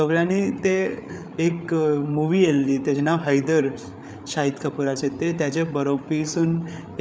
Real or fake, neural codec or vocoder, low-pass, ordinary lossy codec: fake; codec, 16 kHz, 8 kbps, FreqCodec, larger model; none; none